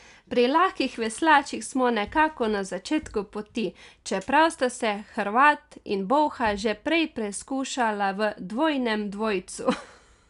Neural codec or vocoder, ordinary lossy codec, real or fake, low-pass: none; none; real; 10.8 kHz